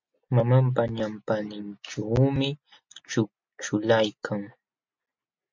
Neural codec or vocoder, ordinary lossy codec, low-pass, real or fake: none; MP3, 48 kbps; 7.2 kHz; real